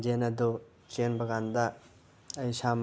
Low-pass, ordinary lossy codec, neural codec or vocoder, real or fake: none; none; none; real